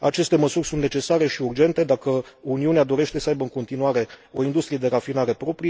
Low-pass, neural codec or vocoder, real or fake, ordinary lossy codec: none; none; real; none